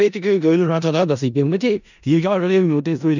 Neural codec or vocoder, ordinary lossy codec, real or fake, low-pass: codec, 16 kHz in and 24 kHz out, 0.4 kbps, LongCat-Audio-Codec, four codebook decoder; none; fake; 7.2 kHz